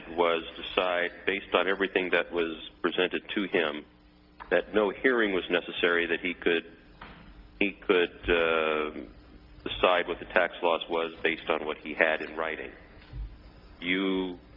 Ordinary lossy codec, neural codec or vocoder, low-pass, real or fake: Opus, 32 kbps; none; 5.4 kHz; real